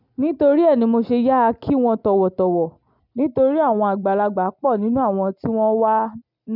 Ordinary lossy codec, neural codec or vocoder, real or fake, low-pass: none; none; real; 5.4 kHz